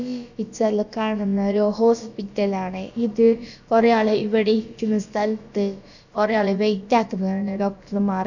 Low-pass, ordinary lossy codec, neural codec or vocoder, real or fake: 7.2 kHz; none; codec, 16 kHz, about 1 kbps, DyCAST, with the encoder's durations; fake